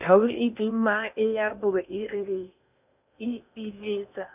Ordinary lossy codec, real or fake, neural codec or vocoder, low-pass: none; fake; codec, 16 kHz in and 24 kHz out, 0.8 kbps, FocalCodec, streaming, 65536 codes; 3.6 kHz